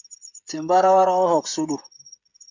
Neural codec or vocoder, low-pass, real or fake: codec, 16 kHz, 16 kbps, FreqCodec, smaller model; 7.2 kHz; fake